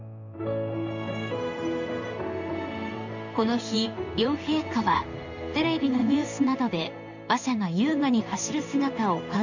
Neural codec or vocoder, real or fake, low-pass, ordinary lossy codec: codec, 16 kHz in and 24 kHz out, 1 kbps, XY-Tokenizer; fake; 7.2 kHz; none